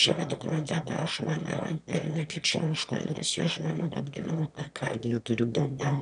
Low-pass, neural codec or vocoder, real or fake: 9.9 kHz; autoencoder, 22.05 kHz, a latent of 192 numbers a frame, VITS, trained on one speaker; fake